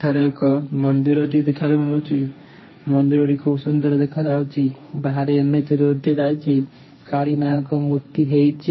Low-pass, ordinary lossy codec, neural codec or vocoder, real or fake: 7.2 kHz; MP3, 24 kbps; codec, 16 kHz, 1.1 kbps, Voila-Tokenizer; fake